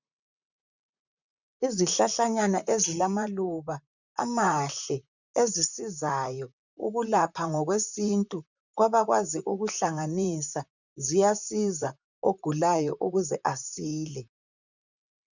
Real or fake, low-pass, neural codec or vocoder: fake; 7.2 kHz; vocoder, 44.1 kHz, 128 mel bands, Pupu-Vocoder